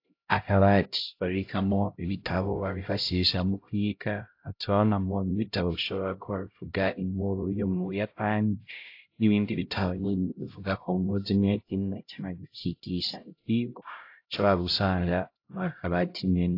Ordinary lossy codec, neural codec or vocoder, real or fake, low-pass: AAC, 32 kbps; codec, 16 kHz, 0.5 kbps, X-Codec, HuBERT features, trained on LibriSpeech; fake; 5.4 kHz